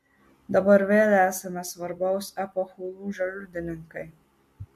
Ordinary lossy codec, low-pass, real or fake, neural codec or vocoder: MP3, 64 kbps; 14.4 kHz; real; none